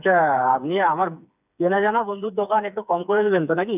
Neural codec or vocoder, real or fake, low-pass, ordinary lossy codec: codec, 16 kHz, 4 kbps, FreqCodec, smaller model; fake; 3.6 kHz; none